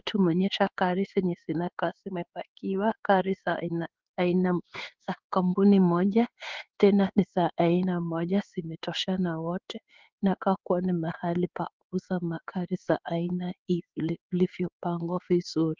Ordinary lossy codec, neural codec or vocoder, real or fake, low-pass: Opus, 24 kbps; codec, 16 kHz in and 24 kHz out, 1 kbps, XY-Tokenizer; fake; 7.2 kHz